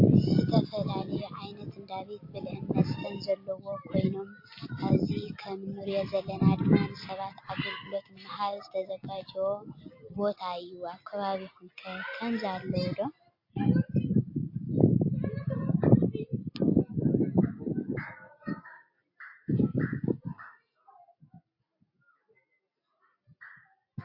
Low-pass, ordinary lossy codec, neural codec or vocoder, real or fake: 5.4 kHz; MP3, 32 kbps; none; real